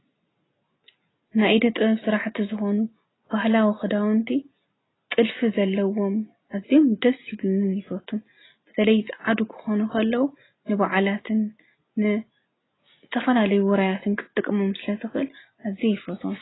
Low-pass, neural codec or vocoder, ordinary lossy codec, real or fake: 7.2 kHz; none; AAC, 16 kbps; real